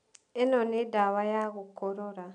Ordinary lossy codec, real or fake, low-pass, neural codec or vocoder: none; real; 9.9 kHz; none